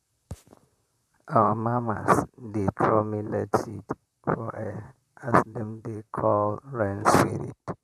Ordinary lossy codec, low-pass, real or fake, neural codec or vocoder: none; 14.4 kHz; fake; vocoder, 44.1 kHz, 128 mel bands, Pupu-Vocoder